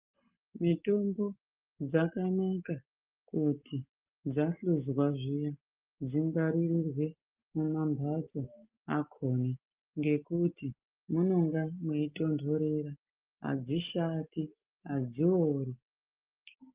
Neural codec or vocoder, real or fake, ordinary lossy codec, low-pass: none; real; Opus, 24 kbps; 3.6 kHz